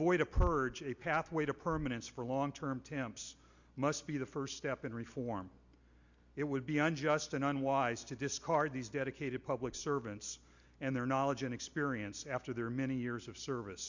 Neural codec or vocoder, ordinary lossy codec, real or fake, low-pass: none; Opus, 64 kbps; real; 7.2 kHz